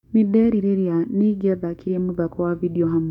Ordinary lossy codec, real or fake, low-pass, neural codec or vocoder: none; fake; 19.8 kHz; codec, 44.1 kHz, 7.8 kbps, DAC